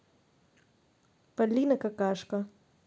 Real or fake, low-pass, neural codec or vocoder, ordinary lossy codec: real; none; none; none